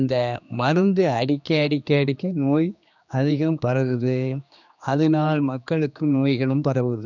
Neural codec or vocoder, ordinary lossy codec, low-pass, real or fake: codec, 16 kHz, 2 kbps, X-Codec, HuBERT features, trained on general audio; none; 7.2 kHz; fake